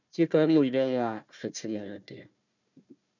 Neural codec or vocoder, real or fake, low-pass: codec, 16 kHz, 1 kbps, FunCodec, trained on Chinese and English, 50 frames a second; fake; 7.2 kHz